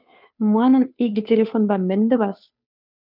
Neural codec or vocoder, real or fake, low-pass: codec, 16 kHz, 2 kbps, FunCodec, trained on Chinese and English, 25 frames a second; fake; 5.4 kHz